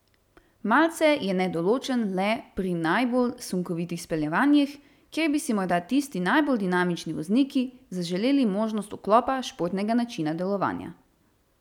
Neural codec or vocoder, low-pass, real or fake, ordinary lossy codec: none; 19.8 kHz; real; none